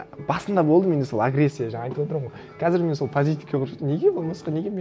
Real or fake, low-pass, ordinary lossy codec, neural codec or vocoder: real; none; none; none